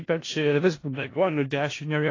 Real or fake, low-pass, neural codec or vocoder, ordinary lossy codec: fake; 7.2 kHz; codec, 16 kHz in and 24 kHz out, 0.4 kbps, LongCat-Audio-Codec, four codebook decoder; AAC, 32 kbps